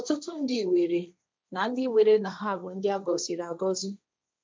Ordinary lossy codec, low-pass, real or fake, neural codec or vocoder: none; none; fake; codec, 16 kHz, 1.1 kbps, Voila-Tokenizer